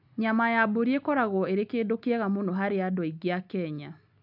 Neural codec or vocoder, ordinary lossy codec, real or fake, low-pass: none; none; real; 5.4 kHz